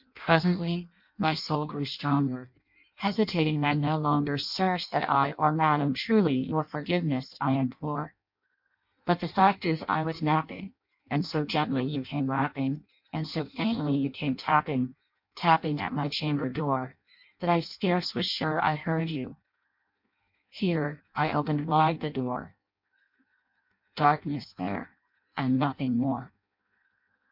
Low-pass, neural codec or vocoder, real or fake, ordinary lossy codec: 5.4 kHz; codec, 16 kHz in and 24 kHz out, 0.6 kbps, FireRedTTS-2 codec; fake; MP3, 48 kbps